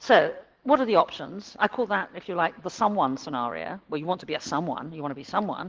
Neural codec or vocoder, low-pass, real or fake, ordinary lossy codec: none; 7.2 kHz; real; Opus, 16 kbps